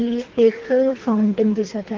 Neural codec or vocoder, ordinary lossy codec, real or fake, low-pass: codec, 24 kHz, 3 kbps, HILCodec; Opus, 16 kbps; fake; 7.2 kHz